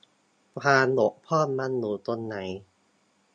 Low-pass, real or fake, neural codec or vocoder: 9.9 kHz; real; none